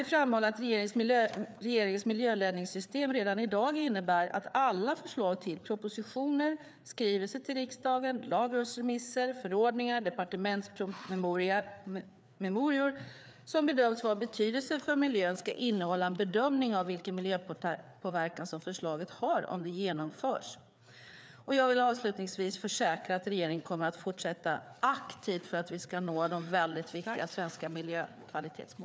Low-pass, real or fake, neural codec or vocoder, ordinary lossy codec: none; fake; codec, 16 kHz, 4 kbps, FreqCodec, larger model; none